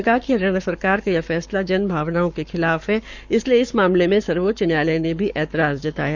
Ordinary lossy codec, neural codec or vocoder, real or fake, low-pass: none; codec, 44.1 kHz, 7.8 kbps, Pupu-Codec; fake; 7.2 kHz